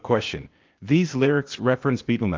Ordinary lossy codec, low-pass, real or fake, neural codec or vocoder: Opus, 24 kbps; 7.2 kHz; fake; codec, 16 kHz, 0.8 kbps, ZipCodec